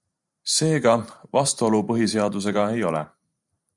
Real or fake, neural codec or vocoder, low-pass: fake; vocoder, 44.1 kHz, 128 mel bands every 512 samples, BigVGAN v2; 10.8 kHz